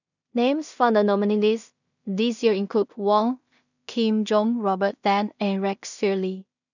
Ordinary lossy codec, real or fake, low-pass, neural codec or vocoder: none; fake; 7.2 kHz; codec, 16 kHz in and 24 kHz out, 0.4 kbps, LongCat-Audio-Codec, two codebook decoder